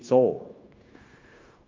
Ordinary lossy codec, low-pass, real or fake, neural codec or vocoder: Opus, 24 kbps; 7.2 kHz; fake; codec, 16 kHz, 0.9 kbps, LongCat-Audio-Codec